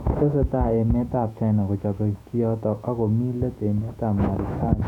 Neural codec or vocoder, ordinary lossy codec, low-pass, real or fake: autoencoder, 48 kHz, 128 numbers a frame, DAC-VAE, trained on Japanese speech; none; 19.8 kHz; fake